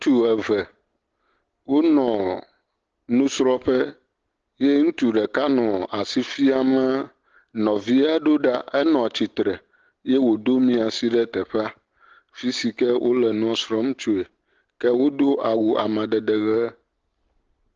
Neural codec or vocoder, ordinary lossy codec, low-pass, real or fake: none; Opus, 16 kbps; 7.2 kHz; real